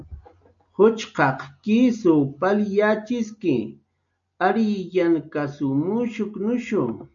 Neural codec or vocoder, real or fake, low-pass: none; real; 7.2 kHz